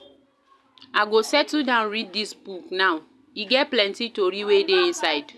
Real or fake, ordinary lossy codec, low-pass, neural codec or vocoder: real; none; none; none